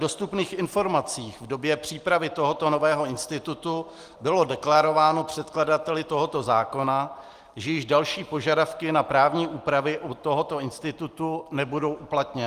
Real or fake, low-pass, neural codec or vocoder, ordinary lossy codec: real; 14.4 kHz; none; Opus, 24 kbps